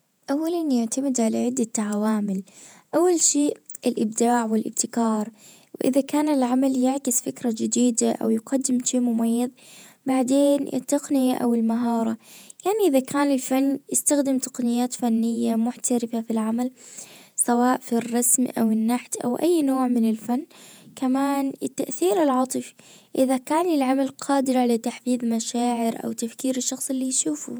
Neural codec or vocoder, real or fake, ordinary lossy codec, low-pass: vocoder, 48 kHz, 128 mel bands, Vocos; fake; none; none